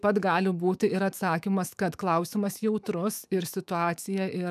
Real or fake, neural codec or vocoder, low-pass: fake; autoencoder, 48 kHz, 128 numbers a frame, DAC-VAE, trained on Japanese speech; 14.4 kHz